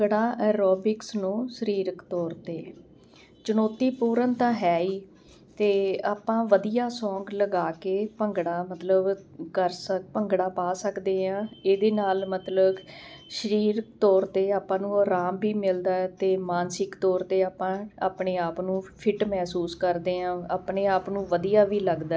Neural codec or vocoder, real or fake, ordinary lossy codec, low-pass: none; real; none; none